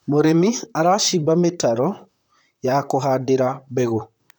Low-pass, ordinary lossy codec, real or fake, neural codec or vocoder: none; none; fake; vocoder, 44.1 kHz, 128 mel bands, Pupu-Vocoder